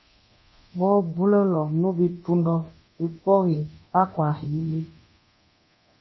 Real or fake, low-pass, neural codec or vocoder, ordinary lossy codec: fake; 7.2 kHz; codec, 24 kHz, 0.9 kbps, DualCodec; MP3, 24 kbps